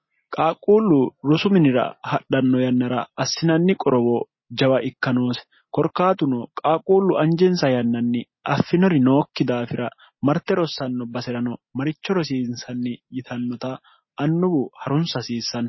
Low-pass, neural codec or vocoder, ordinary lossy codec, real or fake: 7.2 kHz; none; MP3, 24 kbps; real